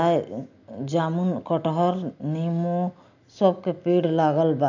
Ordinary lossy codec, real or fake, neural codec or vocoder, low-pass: none; real; none; 7.2 kHz